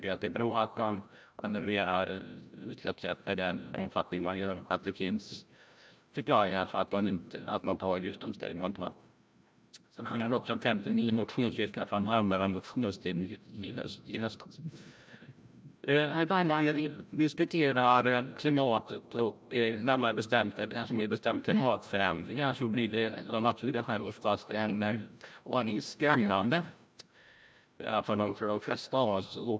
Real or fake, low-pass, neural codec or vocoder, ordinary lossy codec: fake; none; codec, 16 kHz, 0.5 kbps, FreqCodec, larger model; none